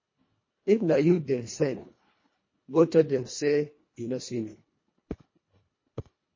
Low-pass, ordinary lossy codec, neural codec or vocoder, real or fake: 7.2 kHz; MP3, 32 kbps; codec, 24 kHz, 1.5 kbps, HILCodec; fake